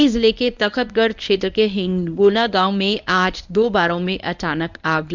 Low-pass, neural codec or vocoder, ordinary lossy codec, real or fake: 7.2 kHz; codec, 24 kHz, 0.9 kbps, WavTokenizer, medium speech release version 1; none; fake